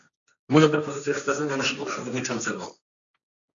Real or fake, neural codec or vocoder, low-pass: fake; codec, 16 kHz, 1.1 kbps, Voila-Tokenizer; 7.2 kHz